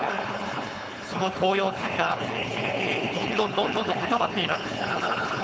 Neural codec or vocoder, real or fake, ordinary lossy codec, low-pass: codec, 16 kHz, 4.8 kbps, FACodec; fake; none; none